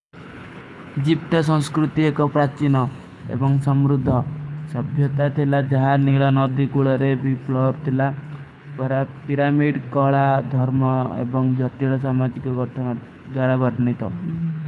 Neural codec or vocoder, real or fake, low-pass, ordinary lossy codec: codec, 24 kHz, 6 kbps, HILCodec; fake; none; none